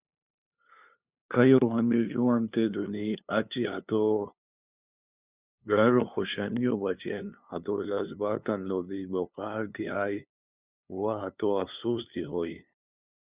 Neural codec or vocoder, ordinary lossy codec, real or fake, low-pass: codec, 16 kHz, 2 kbps, FunCodec, trained on LibriTTS, 25 frames a second; Opus, 64 kbps; fake; 3.6 kHz